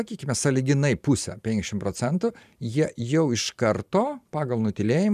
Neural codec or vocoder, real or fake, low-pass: vocoder, 44.1 kHz, 128 mel bands every 512 samples, BigVGAN v2; fake; 14.4 kHz